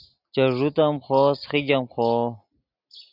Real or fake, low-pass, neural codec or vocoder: real; 5.4 kHz; none